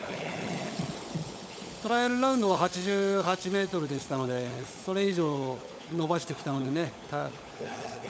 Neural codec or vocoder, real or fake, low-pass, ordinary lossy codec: codec, 16 kHz, 16 kbps, FunCodec, trained on LibriTTS, 50 frames a second; fake; none; none